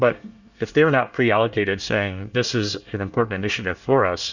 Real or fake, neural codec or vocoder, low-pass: fake; codec, 24 kHz, 1 kbps, SNAC; 7.2 kHz